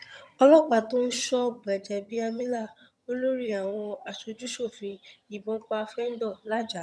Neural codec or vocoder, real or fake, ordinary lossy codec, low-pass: vocoder, 22.05 kHz, 80 mel bands, HiFi-GAN; fake; none; none